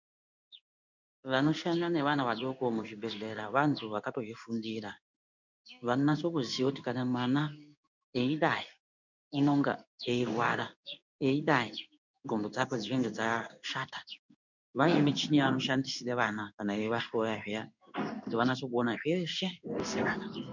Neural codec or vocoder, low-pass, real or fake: codec, 16 kHz in and 24 kHz out, 1 kbps, XY-Tokenizer; 7.2 kHz; fake